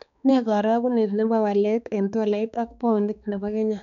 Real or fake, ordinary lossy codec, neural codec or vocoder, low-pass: fake; none; codec, 16 kHz, 2 kbps, X-Codec, HuBERT features, trained on balanced general audio; 7.2 kHz